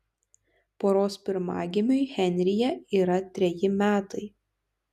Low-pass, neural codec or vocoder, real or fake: 14.4 kHz; none; real